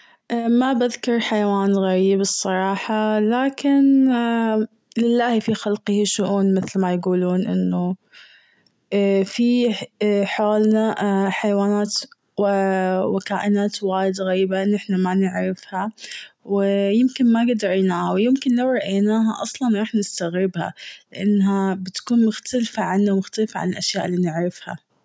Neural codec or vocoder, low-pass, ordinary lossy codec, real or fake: none; none; none; real